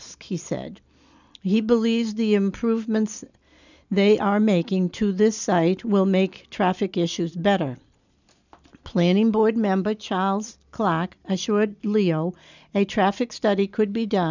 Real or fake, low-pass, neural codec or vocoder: real; 7.2 kHz; none